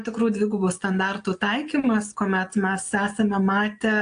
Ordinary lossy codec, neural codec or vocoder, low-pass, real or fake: AAC, 64 kbps; none; 9.9 kHz; real